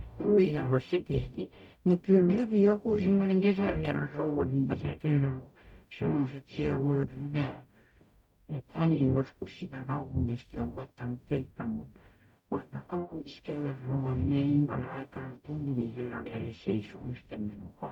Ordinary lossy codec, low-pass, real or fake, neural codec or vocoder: none; 19.8 kHz; fake; codec, 44.1 kHz, 0.9 kbps, DAC